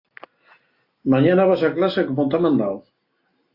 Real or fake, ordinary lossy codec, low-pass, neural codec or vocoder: real; AAC, 32 kbps; 5.4 kHz; none